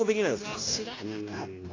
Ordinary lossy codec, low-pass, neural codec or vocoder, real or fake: AAC, 32 kbps; 7.2 kHz; codec, 16 kHz, 0.9 kbps, LongCat-Audio-Codec; fake